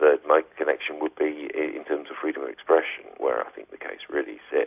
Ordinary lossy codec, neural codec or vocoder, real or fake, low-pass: AAC, 32 kbps; none; real; 3.6 kHz